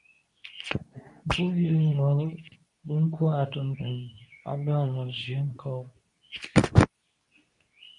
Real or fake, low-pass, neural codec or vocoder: fake; 10.8 kHz; codec, 24 kHz, 0.9 kbps, WavTokenizer, medium speech release version 2